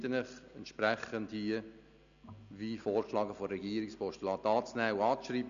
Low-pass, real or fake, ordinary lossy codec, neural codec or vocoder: 7.2 kHz; real; none; none